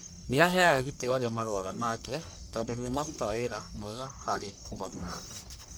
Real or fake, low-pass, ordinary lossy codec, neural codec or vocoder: fake; none; none; codec, 44.1 kHz, 1.7 kbps, Pupu-Codec